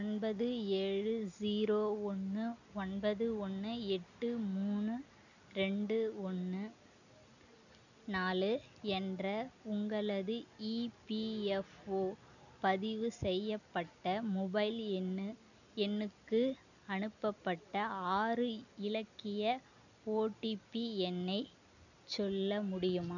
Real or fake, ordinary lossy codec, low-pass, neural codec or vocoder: real; none; 7.2 kHz; none